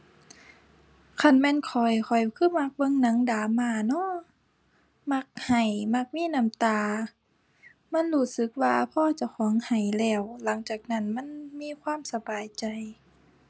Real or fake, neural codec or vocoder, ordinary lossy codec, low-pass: real; none; none; none